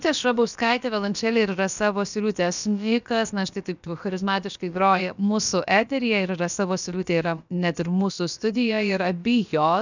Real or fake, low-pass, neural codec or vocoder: fake; 7.2 kHz; codec, 16 kHz, about 1 kbps, DyCAST, with the encoder's durations